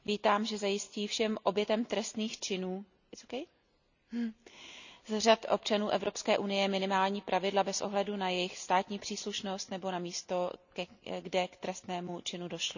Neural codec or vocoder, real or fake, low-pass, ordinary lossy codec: none; real; 7.2 kHz; none